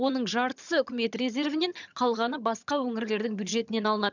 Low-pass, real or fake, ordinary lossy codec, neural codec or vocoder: 7.2 kHz; fake; none; vocoder, 22.05 kHz, 80 mel bands, HiFi-GAN